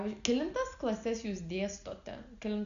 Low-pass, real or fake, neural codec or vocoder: 7.2 kHz; real; none